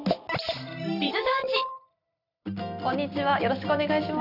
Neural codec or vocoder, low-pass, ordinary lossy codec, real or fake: none; 5.4 kHz; none; real